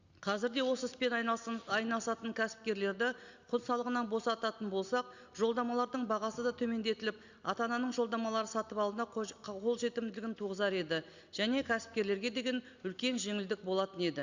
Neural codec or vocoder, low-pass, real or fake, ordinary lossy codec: none; 7.2 kHz; real; Opus, 64 kbps